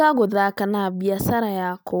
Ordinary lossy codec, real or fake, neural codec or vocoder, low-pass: none; real; none; none